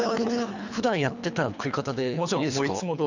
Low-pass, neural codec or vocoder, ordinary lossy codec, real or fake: 7.2 kHz; codec, 24 kHz, 3 kbps, HILCodec; none; fake